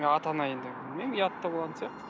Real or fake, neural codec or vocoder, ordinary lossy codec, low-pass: real; none; none; none